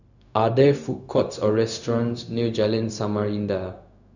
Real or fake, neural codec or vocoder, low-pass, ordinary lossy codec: fake; codec, 16 kHz, 0.4 kbps, LongCat-Audio-Codec; 7.2 kHz; none